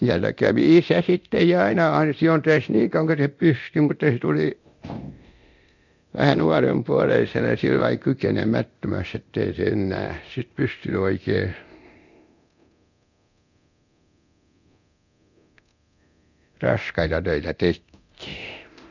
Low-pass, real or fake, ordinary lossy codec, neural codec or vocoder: 7.2 kHz; fake; none; codec, 24 kHz, 0.9 kbps, DualCodec